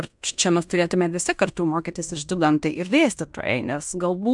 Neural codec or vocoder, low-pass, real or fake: codec, 16 kHz in and 24 kHz out, 0.9 kbps, LongCat-Audio-Codec, fine tuned four codebook decoder; 10.8 kHz; fake